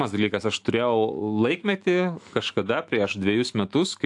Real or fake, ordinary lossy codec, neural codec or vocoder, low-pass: fake; AAC, 64 kbps; autoencoder, 48 kHz, 128 numbers a frame, DAC-VAE, trained on Japanese speech; 10.8 kHz